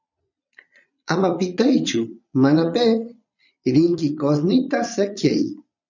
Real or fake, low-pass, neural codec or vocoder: fake; 7.2 kHz; vocoder, 22.05 kHz, 80 mel bands, Vocos